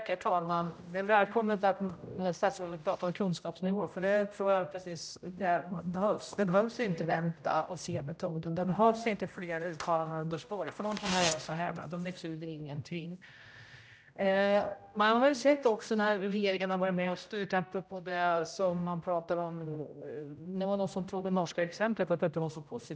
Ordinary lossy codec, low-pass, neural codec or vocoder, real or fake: none; none; codec, 16 kHz, 0.5 kbps, X-Codec, HuBERT features, trained on general audio; fake